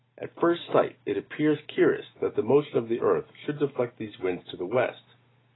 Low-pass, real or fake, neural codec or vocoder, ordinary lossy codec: 7.2 kHz; real; none; AAC, 16 kbps